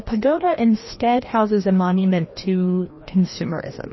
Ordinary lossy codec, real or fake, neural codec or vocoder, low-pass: MP3, 24 kbps; fake; codec, 16 kHz, 1 kbps, FreqCodec, larger model; 7.2 kHz